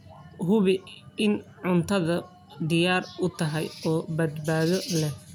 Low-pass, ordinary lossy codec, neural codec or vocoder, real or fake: none; none; none; real